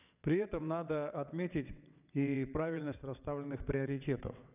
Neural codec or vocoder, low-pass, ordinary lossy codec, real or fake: vocoder, 22.05 kHz, 80 mel bands, WaveNeXt; 3.6 kHz; none; fake